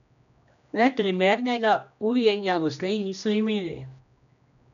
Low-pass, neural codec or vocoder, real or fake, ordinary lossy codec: 7.2 kHz; codec, 16 kHz, 1 kbps, X-Codec, HuBERT features, trained on general audio; fake; none